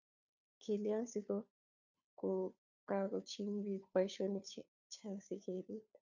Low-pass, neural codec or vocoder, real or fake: 7.2 kHz; codec, 16 kHz, 2 kbps, FunCodec, trained on Chinese and English, 25 frames a second; fake